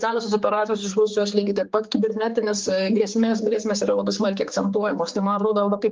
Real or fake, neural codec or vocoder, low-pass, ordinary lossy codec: fake; codec, 16 kHz, 4 kbps, X-Codec, HuBERT features, trained on general audio; 7.2 kHz; Opus, 24 kbps